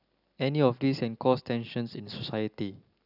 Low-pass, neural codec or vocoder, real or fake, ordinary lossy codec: 5.4 kHz; none; real; none